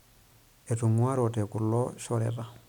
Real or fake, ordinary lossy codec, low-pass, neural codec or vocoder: real; none; 19.8 kHz; none